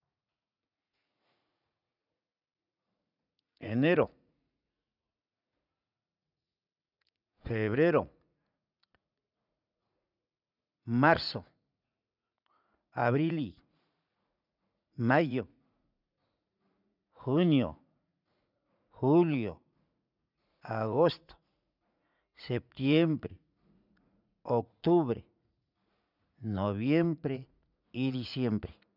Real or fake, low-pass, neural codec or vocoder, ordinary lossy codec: fake; 5.4 kHz; vocoder, 44.1 kHz, 80 mel bands, Vocos; none